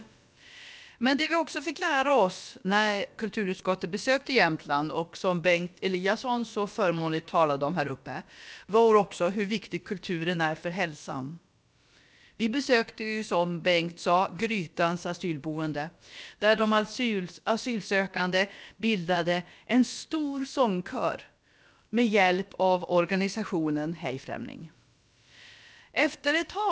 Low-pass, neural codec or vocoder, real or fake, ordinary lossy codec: none; codec, 16 kHz, about 1 kbps, DyCAST, with the encoder's durations; fake; none